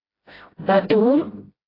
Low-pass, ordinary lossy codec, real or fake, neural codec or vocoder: 5.4 kHz; AAC, 24 kbps; fake; codec, 16 kHz, 0.5 kbps, FreqCodec, smaller model